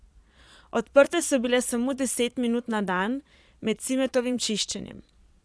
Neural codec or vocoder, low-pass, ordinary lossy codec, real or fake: vocoder, 22.05 kHz, 80 mel bands, Vocos; none; none; fake